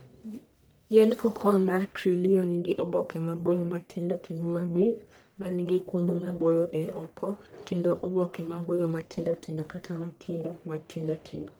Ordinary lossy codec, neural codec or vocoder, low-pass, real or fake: none; codec, 44.1 kHz, 1.7 kbps, Pupu-Codec; none; fake